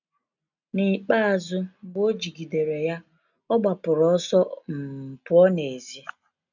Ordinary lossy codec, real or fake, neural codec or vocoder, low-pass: none; real; none; 7.2 kHz